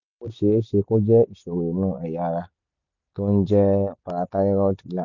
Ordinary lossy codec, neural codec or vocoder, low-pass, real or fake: none; none; 7.2 kHz; real